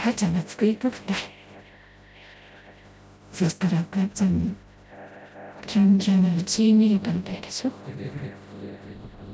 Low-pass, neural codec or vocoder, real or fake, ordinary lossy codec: none; codec, 16 kHz, 0.5 kbps, FreqCodec, smaller model; fake; none